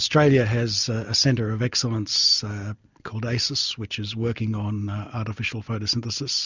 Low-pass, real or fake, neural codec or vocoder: 7.2 kHz; real; none